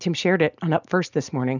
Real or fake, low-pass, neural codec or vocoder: real; 7.2 kHz; none